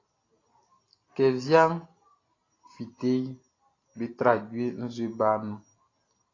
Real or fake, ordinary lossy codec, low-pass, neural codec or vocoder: real; AAC, 32 kbps; 7.2 kHz; none